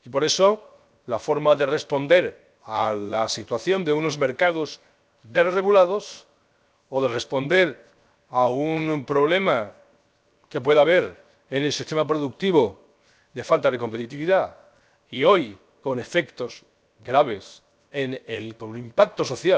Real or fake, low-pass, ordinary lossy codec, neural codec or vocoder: fake; none; none; codec, 16 kHz, 0.7 kbps, FocalCodec